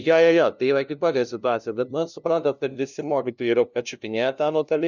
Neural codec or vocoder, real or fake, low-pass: codec, 16 kHz, 0.5 kbps, FunCodec, trained on LibriTTS, 25 frames a second; fake; 7.2 kHz